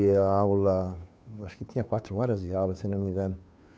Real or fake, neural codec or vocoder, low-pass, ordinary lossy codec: fake; codec, 16 kHz, 2 kbps, FunCodec, trained on Chinese and English, 25 frames a second; none; none